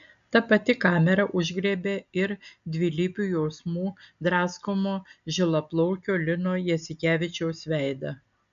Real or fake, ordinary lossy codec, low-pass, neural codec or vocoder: real; MP3, 96 kbps; 7.2 kHz; none